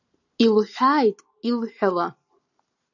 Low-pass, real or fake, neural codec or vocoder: 7.2 kHz; real; none